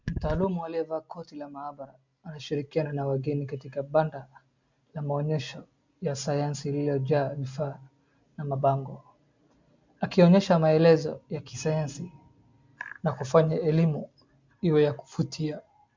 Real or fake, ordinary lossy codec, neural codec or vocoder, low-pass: real; MP3, 64 kbps; none; 7.2 kHz